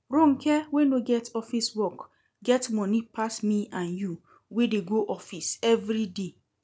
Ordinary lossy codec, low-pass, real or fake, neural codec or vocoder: none; none; real; none